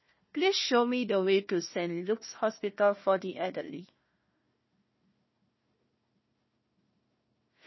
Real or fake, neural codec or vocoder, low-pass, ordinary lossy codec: fake; codec, 16 kHz, 1 kbps, FunCodec, trained on Chinese and English, 50 frames a second; 7.2 kHz; MP3, 24 kbps